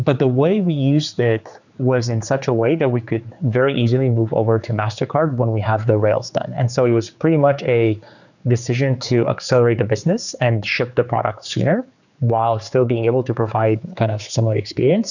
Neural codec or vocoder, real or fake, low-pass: codec, 16 kHz, 4 kbps, X-Codec, HuBERT features, trained on general audio; fake; 7.2 kHz